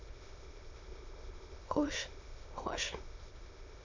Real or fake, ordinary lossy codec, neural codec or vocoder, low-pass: fake; MP3, 64 kbps; autoencoder, 22.05 kHz, a latent of 192 numbers a frame, VITS, trained on many speakers; 7.2 kHz